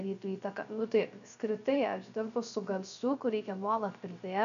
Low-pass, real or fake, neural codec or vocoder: 7.2 kHz; fake; codec, 16 kHz, 0.3 kbps, FocalCodec